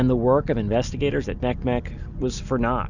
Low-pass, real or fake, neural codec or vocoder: 7.2 kHz; real; none